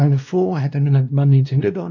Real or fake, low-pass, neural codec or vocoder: fake; 7.2 kHz; codec, 16 kHz, 1 kbps, X-Codec, WavLM features, trained on Multilingual LibriSpeech